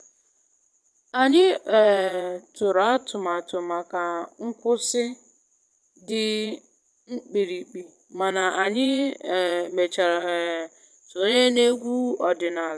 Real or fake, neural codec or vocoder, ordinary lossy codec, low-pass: fake; vocoder, 22.05 kHz, 80 mel bands, Vocos; none; none